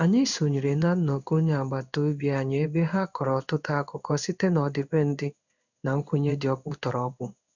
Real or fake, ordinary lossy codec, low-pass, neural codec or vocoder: fake; none; 7.2 kHz; codec, 16 kHz in and 24 kHz out, 1 kbps, XY-Tokenizer